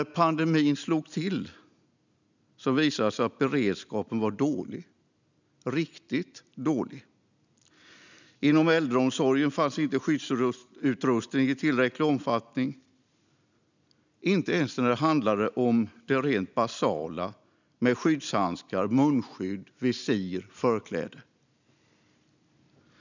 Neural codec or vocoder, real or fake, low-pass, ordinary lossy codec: none; real; 7.2 kHz; none